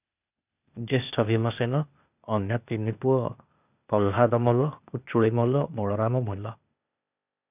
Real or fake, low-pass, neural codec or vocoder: fake; 3.6 kHz; codec, 16 kHz, 0.8 kbps, ZipCodec